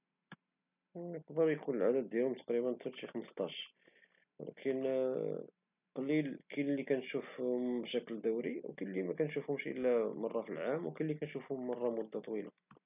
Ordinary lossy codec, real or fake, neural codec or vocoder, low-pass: none; real; none; 3.6 kHz